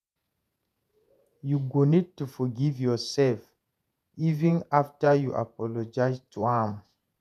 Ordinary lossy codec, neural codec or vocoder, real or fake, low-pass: none; vocoder, 48 kHz, 128 mel bands, Vocos; fake; 14.4 kHz